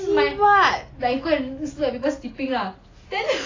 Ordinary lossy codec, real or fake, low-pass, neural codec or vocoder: AAC, 32 kbps; real; 7.2 kHz; none